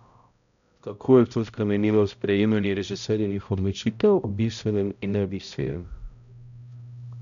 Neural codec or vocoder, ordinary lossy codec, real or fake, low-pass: codec, 16 kHz, 0.5 kbps, X-Codec, HuBERT features, trained on balanced general audio; none; fake; 7.2 kHz